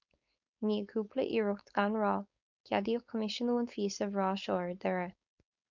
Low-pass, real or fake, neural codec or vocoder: 7.2 kHz; fake; codec, 16 kHz, 4.8 kbps, FACodec